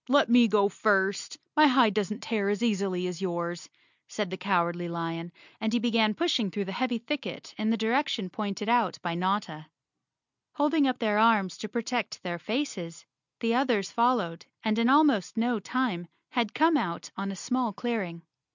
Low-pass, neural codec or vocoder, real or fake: 7.2 kHz; none; real